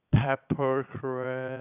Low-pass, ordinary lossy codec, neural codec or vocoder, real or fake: 3.6 kHz; none; vocoder, 22.05 kHz, 80 mel bands, Vocos; fake